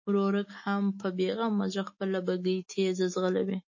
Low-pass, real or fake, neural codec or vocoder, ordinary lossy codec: 7.2 kHz; fake; autoencoder, 48 kHz, 128 numbers a frame, DAC-VAE, trained on Japanese speech; MP3, 32 kbps